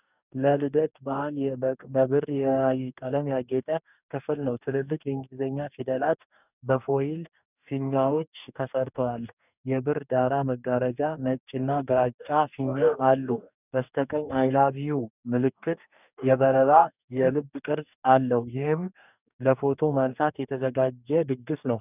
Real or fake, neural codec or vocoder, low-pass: fake; codec, 44.1 kHz, 2.6 kbps, DAC; 3.6 kHz